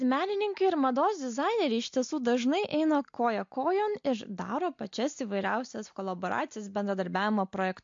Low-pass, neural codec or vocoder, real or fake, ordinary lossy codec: 7.2 kHz; none; real; MP3, 48 kbps